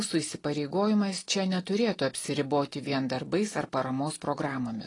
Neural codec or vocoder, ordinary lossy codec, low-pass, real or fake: none; AAC, 32 kbps; 10.8 kHz; real